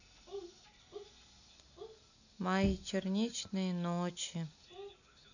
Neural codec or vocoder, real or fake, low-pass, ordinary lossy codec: none; real; 7.2 kHz; none